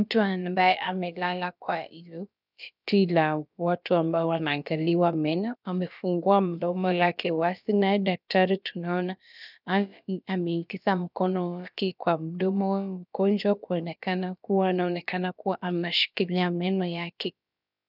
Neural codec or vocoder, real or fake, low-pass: codec, 16 kHz, about 1 kbps, DyCAST, with the encoder's durations; fake; 5.4 kHz